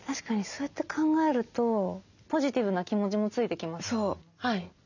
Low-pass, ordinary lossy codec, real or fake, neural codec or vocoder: 7.2 kHz; none; real; none